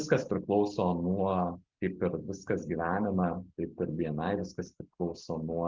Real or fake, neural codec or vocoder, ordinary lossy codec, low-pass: real; none; Opus, 32 kbps; 7.2 kHz